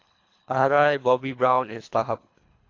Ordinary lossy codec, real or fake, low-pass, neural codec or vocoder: AAC, 48 kbps; fake; 7.2 kHz; codec, 24 kHz, 3 kbps, HILCodec